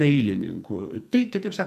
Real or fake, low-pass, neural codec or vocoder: fake; 14.4 kHz; codec, 44.1 kHz, 2.6 kbps, SNAC